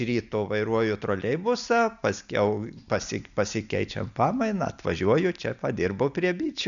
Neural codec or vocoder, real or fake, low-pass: none; real; 7.2 kHz